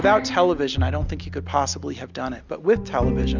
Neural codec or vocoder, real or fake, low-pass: none; real; 7.2 kHz